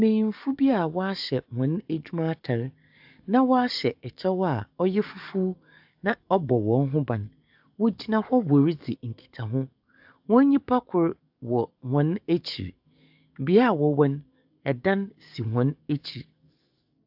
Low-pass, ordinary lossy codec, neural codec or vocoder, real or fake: 5.4 kHz; AAC, 48 kbps; codec, 44.1 kHz, 7.8 kbps, DAC; fake